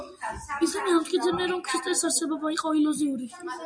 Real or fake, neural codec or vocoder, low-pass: real; none; 9.9 kHz